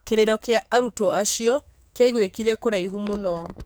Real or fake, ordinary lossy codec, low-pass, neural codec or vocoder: fake; none; none; codec, 44.1 kHz, 2.6 kbps, SNAC